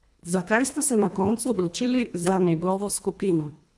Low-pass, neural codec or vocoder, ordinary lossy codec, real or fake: none; codec, 24 kHz, 1.5 kbps, HILCodec; none; fake